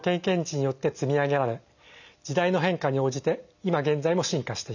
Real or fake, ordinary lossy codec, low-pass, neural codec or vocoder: real; none; 7.2 kHz; none